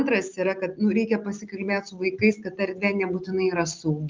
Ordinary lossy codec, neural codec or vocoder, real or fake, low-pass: Opus, 24 kbps; none; real; 7.2 kHz